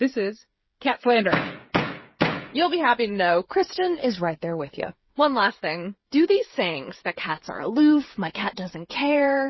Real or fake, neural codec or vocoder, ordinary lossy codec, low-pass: fake; codec, 16 kHz, 8 kbps, FreqCodec, smaller model; MP3, 24 kbps; 7.2 kHz